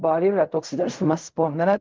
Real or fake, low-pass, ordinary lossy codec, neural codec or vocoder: fake; 7.2 kHz; Opus, 24 kbps; codec, 16 kHz in and 24 kHz out, 0.4 kbps, LongCat-Audio-Codec, fine tuned four codebook decoder